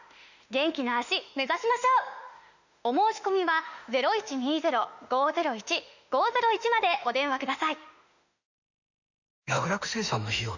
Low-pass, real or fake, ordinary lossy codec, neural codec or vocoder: 7.2 kHz; fake; none; autoencoder, 48 kHz, 32 numbers a frame, DAC-VAE, trained on Japanese speech